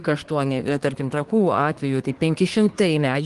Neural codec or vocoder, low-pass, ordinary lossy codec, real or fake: codec, 24 kHz, 1 kbps, SNAC; 10.8 kHz; Opus, 24 kbps; fake